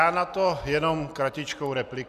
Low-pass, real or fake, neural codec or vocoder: 14.4 kHz; real; none